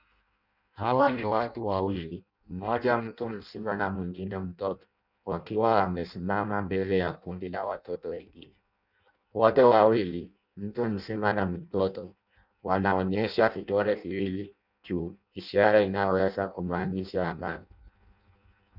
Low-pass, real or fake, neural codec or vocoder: 5.4 kHz; fake; codec, 16 kHz in and 24 kHz out, 0.6 kbps, FireRedTTS-2 codec